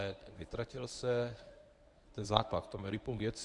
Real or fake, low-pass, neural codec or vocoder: fake; 10.8 kHz; codec, 24 kHz, 0.9 kbps, WavTokenizer, medium speech release version 1